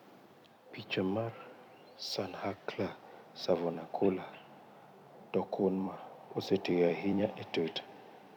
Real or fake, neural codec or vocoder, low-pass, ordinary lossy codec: fake; vocoder, 44.1 kHz, 128 mel bands every 256 samples, BigVGAN v2; 19.8 kHz; none